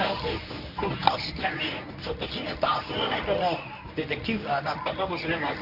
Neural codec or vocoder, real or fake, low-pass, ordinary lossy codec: codec, 24 kHz, 0.9 kbps, WavTokenizer, medium speech release version 1; fake; 5.4 kHz; none